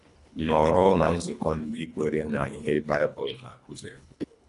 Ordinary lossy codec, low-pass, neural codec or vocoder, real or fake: none; 10.8 kHz; codec, 24 kHz, 1.5 kbps, HILCodec; fake